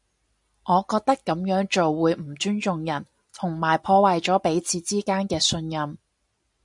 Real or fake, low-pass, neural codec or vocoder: real; 10.8 kHz; none